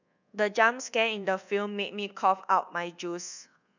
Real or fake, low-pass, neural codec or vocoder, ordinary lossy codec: fake; 7.2 kHz; codec, 24 kHz, 1.2 kbps, DualCodec; none